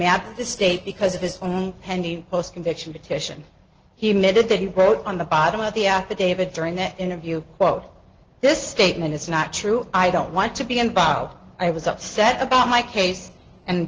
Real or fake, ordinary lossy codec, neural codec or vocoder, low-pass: real; Opus, 16 kbps; none; 7.2 kHz